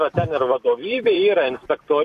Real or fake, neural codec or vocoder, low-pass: fake; vocoder, 44.1 kHz, 128 mel bands every 256 samples, BigVGAN v2; 14.4 kHz